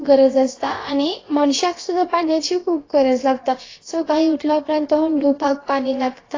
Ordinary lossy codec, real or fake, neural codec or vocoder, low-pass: AAC, 32 kbps; fake; codec, 16 kHz, about 1 kbps, DyCAST, with the encoder's durations; 7.2 kHz